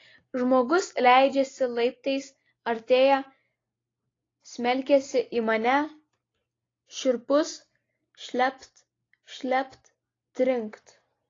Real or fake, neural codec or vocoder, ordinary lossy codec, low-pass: real; none; AAC, 32 kbps; 7.2 kHz